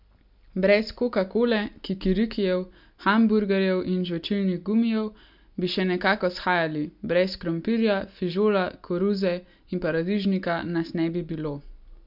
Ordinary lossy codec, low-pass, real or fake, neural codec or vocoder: MP3, 48 kbps; 5.4 kHz; real; none